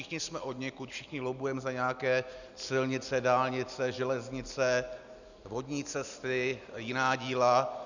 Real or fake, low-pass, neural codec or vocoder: real; 7.2 kHz; none